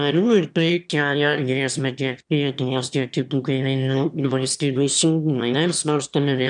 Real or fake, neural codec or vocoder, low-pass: fake; autoencoder, 22.05 kHz, a latent of 192 numbers a frame, VITS, trained on one speaker; 9.9 kHz